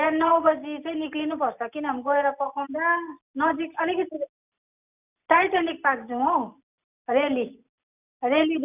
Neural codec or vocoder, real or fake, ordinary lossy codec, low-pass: none; real; none; 3.6 kHz